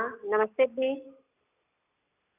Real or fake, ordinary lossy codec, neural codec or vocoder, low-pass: real; none; none; 3.6 kHz